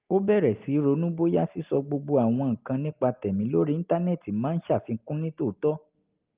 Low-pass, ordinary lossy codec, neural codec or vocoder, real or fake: 3.6 kHz; Opus, 32 kbps; none; real